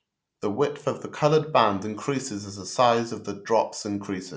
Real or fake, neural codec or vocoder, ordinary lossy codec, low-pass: real; none; none; none